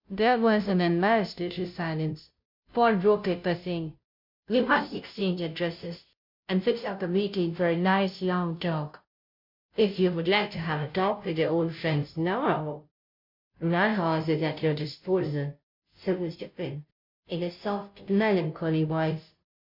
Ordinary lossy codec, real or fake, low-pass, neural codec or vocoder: MP3, 48 kbps; fake; 5.4 kHz; codec, 16 kHz, 0.5 kbps, FunCodec, trained on Chinese and English, 25 frames a second